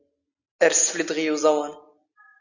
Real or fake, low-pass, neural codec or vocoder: real; 7.2 kHz; none